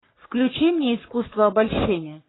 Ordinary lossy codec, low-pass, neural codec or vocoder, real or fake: AAC, 16 kbps; 7.2 kHz; codec, 44.1 kHz, 3.4 kbps, Pupu-Codec; fake